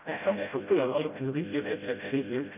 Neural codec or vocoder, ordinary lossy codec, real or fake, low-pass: codec, 16 kHz, 0.5 kbps, FreqCodec, smaller model; none; fake; 3.6 kHz